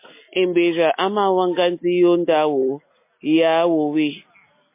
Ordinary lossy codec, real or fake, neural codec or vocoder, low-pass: MP3, 24 kbps; real; none; 3.6 kHz